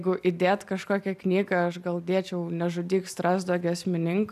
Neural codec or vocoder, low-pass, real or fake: none; 14.4 kHz; real